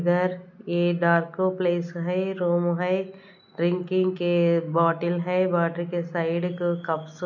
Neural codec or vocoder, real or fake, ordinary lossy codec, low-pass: none; real; none; 7.2 kHz